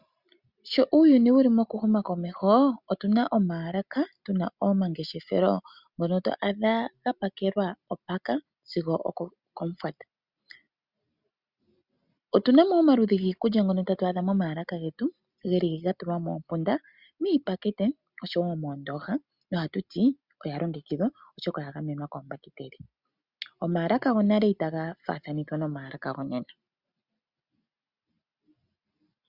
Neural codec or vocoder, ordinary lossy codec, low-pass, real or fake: none; Opus, 64 kbps; 5.4 kHz; real